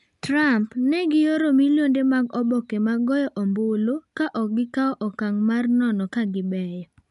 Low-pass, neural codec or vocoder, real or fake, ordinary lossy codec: 10.8 kHz; none; real; none